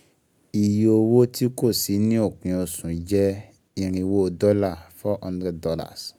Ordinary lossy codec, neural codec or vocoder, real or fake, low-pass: none; none; real; 19.8 kHz